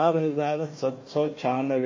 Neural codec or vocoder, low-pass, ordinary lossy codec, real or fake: codec, 16 kHz, 1 kbps, FunCodec, trained on Chinese and English, 50 frames a second; 7.2 kHz; MP3, 32 kbps; fake